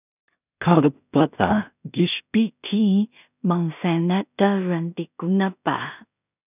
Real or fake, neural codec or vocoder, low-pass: fake; codec, 16 kHz in and 24 kHz out, 0.4 kbps, LongCat-Audio-Codec, two codebook decoder; 3.6 kHz